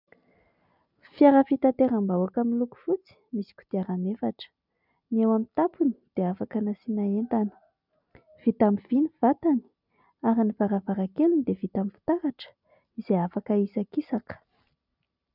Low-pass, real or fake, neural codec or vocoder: 5.4 kHz; real; none